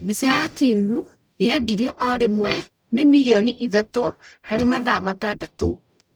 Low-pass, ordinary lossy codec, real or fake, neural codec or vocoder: none; none; fake; codec, 44.1 kHz, 0.9 kbps, DAC